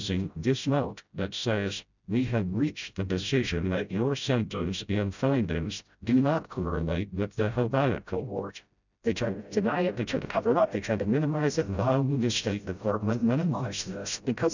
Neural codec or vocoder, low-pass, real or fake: codec, 16 kHz, 0.5 kbps, FreqCodec, smaller model; 7.2 kHz; fake